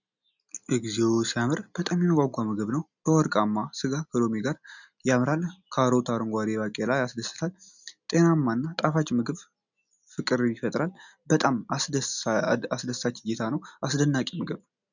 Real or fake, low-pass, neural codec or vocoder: real; 7.2 kHz; none